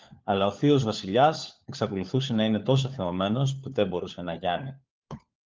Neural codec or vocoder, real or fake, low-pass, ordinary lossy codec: codec, 16 kHz, 4 kbps, FunCodec, trained on LibriTTS, 50 frames a second; fake; 7.2 kHz; Opus, 24 kbps